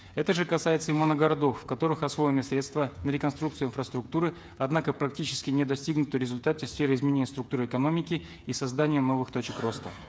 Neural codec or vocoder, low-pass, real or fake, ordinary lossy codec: codec, 16 kHz, 8 kbps, FreqCodec, smaller model; none; fake; none